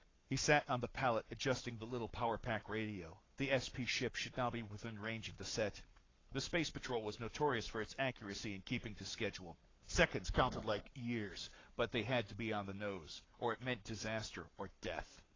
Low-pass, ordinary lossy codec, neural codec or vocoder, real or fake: 7.2 kHz; AAC, 32 kbps; codec, 44.1 kHz, 7.8 kbps, Pupu-Codec; fake